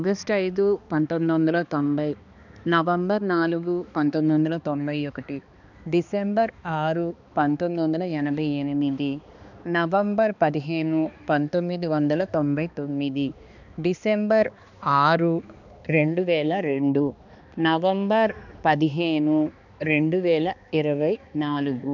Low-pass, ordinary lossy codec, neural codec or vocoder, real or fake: 7.2 kHz; none; codec, 16 kHz, 2 kbps, X-Codec, HuBERT features, trained on balanced general audio; fake